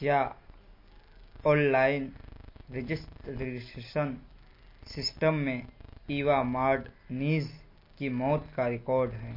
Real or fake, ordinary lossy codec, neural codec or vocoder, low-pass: real; MP3, 32 kbps; none; 5.4 kHz